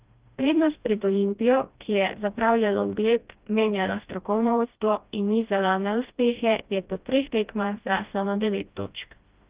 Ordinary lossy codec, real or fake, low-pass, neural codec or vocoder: Opus, 32 kbps; fake; 3.6 kHz; codec, 16 kHz, 1 kbps, FreqCodec, smaller model